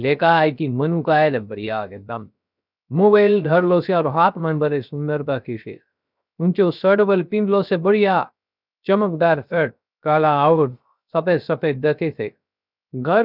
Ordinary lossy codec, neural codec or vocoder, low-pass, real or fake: none; codec, 16 kHz, 0.3 kbps, FocalCodec; 5.4 kHz; fake